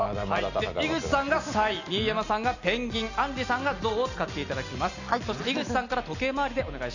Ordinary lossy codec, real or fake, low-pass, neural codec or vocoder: none; real; 7.2 kHz; none